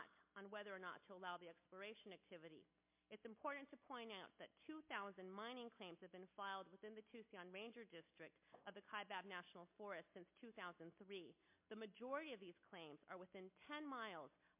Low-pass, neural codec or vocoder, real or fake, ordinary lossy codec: 3.6 kHz; none; real; MP3, 32 kbps